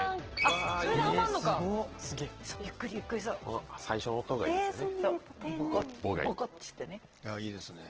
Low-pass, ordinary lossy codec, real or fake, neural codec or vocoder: 7.2 kHz; Opus, 16 kbps; real; none